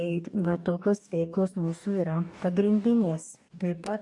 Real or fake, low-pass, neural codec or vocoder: fake; 10.8 kHz; codec, 44.1 kHz, 2.6 kbps, DAC